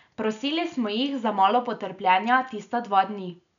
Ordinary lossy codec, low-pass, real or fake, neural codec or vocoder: none; 7.2 kHz; real; none